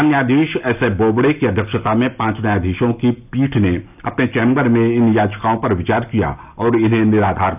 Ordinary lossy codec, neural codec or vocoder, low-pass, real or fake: none; none; 3.6 kHz; real